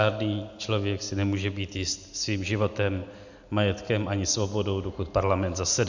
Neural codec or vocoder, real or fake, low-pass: none; real; 7.2 kHz